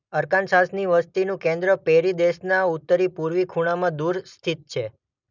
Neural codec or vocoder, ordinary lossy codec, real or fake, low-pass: none; none; real; 7.2 kHz